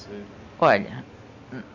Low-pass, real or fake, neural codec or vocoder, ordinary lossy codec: 7.2 kHz; real; none; none